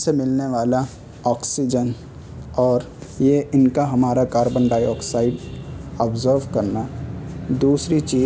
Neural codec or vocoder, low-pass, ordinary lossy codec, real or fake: none; none; none; real